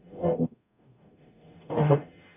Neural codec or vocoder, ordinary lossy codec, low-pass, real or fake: codec, 44.1 kHz, 0.9 kbps, DAC; none; 3.6 kHz; fake